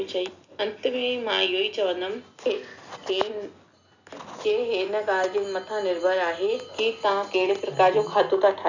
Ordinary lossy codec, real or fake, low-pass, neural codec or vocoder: none; real; 7.2 kHz; none